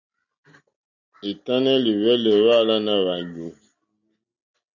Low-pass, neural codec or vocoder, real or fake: 7.2 kHz; none; real